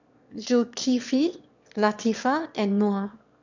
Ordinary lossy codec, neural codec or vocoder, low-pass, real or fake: none; autoencoder, 22.05 kHz, a latent of 192 numbers a frame, VITS, trained on one speaker; 7.2 kHz; fake